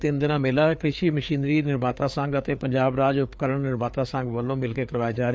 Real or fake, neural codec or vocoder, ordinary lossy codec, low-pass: fake; codec, 16 kHz, 4 kbps, FreqCodec, larger model; none; none